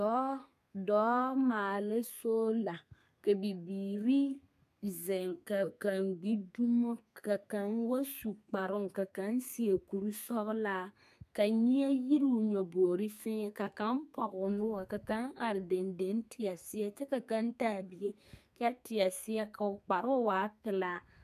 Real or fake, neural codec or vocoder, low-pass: fake; codec, 32 kHz, 1.9 kbps, SNAC; 14.4 kHz